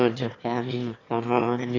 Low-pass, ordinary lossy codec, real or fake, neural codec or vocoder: 7.2 kHz; none; fake; autoencoder, 22.05 kHz, a latent of 192 numbers a frame, VITS, trained on one speaker